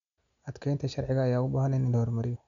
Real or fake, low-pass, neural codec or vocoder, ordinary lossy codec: real; 7.2 kHz; none; none